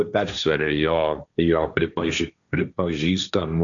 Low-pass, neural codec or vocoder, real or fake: 7.2 kHz; codec, 16 kHz, 1.1 kbps, Voila-Tokenizer; fake